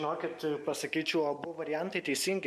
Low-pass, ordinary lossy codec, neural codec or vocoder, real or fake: 14.4 kHz; MP3, 64 kbps; none; real